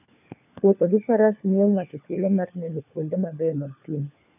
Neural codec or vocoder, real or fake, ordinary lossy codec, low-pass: codec, 16 kHz, 4 kbps, FunCodec, trained on LibriTTS, 50 frames a second; fake; none; 3.6 kHz